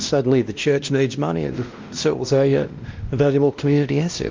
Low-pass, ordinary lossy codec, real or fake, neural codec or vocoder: 7.2 kHz; Opus, 24 kbps; fake; codec, 16 kHz, 1 kbps, X-Codec, WavLM features, trained on Multilingual LibriSpeech